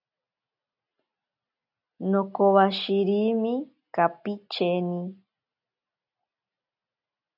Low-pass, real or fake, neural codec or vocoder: 5.4 kHz; real; none